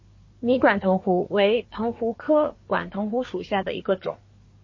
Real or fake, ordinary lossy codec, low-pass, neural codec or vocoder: fake; MP3, 32 kbps; 7.2 kHz; codec, 24 kHz, 1 kbps, SNAC